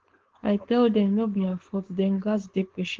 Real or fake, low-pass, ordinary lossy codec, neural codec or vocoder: fake; 7.2 kHz; Opus, 16 kbps; codec, 16 kHz, 4.8 kbps, FACodec